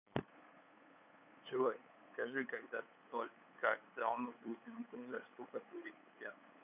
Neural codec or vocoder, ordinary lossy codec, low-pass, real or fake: codec, 16 kHz, 8 kbps, FunCodec, trained on LibriTTS, 25 frames a second; none; 3.6 kHz; fake